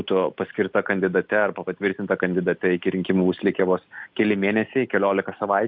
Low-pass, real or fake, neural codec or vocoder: 5.4 kHz; real; none